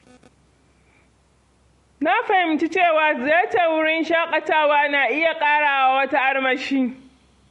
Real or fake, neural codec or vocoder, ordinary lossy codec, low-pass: real; none; MP3, 48 kbps; 14.4 kHz